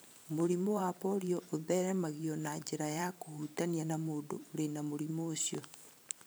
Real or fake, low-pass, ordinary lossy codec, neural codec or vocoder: fake; none; none; vocoder, 44.1 kHz, 128 mel bands every 512 samples, BigVGAN v2